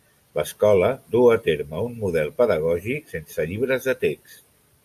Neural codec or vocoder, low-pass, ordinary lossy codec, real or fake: none; 14.4 kHz; AAC, 96 kbps; real